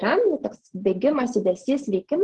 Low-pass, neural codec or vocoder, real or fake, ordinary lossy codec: 10.8 kHz; none; real; Opus, 16 kbps